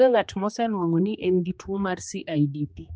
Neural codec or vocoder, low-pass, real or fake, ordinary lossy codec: codec, 16 kHz, 2 kbps, X-Codec, HuBERT features, trained on general audio; none; fake; none